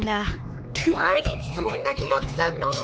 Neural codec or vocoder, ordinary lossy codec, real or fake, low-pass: codec, 16 kHz, 2 kbps, X-Codec, HuBERT features, trained on LibriSpeech; none; fake; none